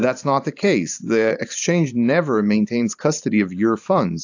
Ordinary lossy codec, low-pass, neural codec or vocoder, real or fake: AAC, 48 kbps; 7.2 kHz; vocoder, 44.1 kHz, 128 mel bands every 512 samples, BigVGAN v2; fake